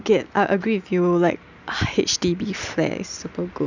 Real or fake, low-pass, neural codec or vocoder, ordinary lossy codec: real; 7.2 kHz; none; none